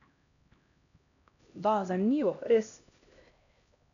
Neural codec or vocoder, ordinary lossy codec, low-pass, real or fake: codec, 16 kHz, 1 kbps, X-Codec, HuBERT features, trained on LibriSpeech; none; 7.2 kHz; fake